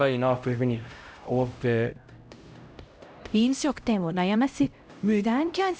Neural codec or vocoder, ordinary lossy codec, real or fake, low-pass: codec, 16 kHz, 0.5 kbps, X-Codec, HuBERT features, trained on LibriSpeech; none; fake; none